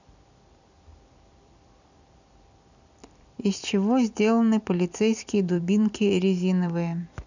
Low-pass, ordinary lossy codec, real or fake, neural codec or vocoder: 7.2 kHz; none; fake; vocoder, 44.1 kHz, 128 mel bands every 256 samples, BigVGAN v2